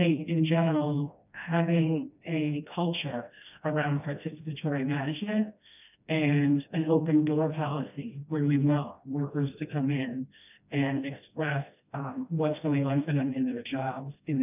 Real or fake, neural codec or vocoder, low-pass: fake; codec, 16 kHz, 1 kbps, FreqCodec, smaller model; 3.6 kHz